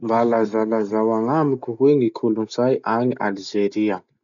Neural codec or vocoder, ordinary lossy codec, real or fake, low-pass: none; none; real; 7.2 kHz